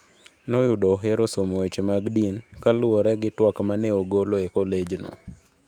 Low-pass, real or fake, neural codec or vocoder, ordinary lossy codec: 19.8 kHz; fake; autoencoder, 48 kHz, 128 numbers a frame, DAC-VAE, trained on Japanese speech; Opus, 64 kbps